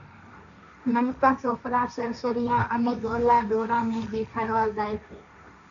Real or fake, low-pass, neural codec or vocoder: fake; 7.2 kHz; codec, 16 kHz, 1.1 kbps, Voila-Tokenizer